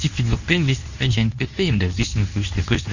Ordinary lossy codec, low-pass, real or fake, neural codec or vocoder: none; 7.2 kHz; fake; codec, 24 kHz, 0.9 kbps, WavTokenizer, medium speech release version 2